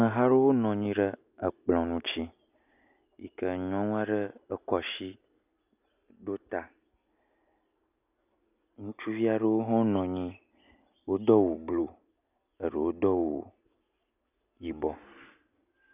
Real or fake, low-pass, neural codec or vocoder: real; 3.6 kHz; none